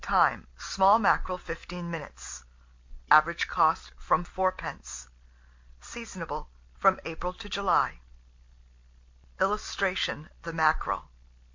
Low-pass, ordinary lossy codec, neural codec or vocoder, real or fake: 7.2 kHz; MP3, 48 kbps; none; real